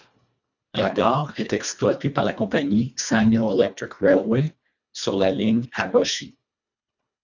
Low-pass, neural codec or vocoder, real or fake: 7.2 kHz; codec, 24 kHz, 1.5 kbps, HILCodec; fake